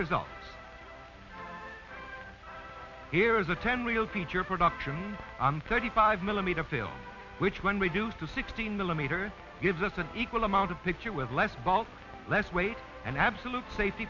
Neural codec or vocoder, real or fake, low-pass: none; real; 7.2 kHz